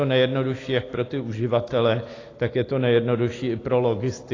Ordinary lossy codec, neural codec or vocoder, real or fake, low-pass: AAC, 32 kbps; none; real; 7.2 kHz